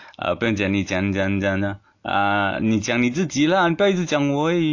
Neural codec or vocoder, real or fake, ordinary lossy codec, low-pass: none; real; AAC, 48 kbps; 7.2 kHz